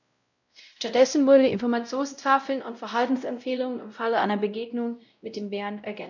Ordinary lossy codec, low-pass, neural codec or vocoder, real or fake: none; 7.2 kHz; codec, 16 kHz, 0.5 kbps, X-Codec, WavLM features, trained on Multilingual LibriSpeech; fake